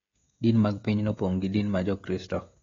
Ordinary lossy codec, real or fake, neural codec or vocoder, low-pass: AAC, 32 kbps; fake; codec, 16 kHz, 16 kbps, FreqCodec, smaller model; 7.2 kHz